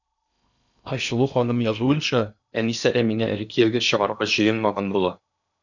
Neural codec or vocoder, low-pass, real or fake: codec, 16 kHz in and 24 kHz out, 0.8 kbps, FocalCodec, streaming, 65536 codes; 7.2 kHz; fake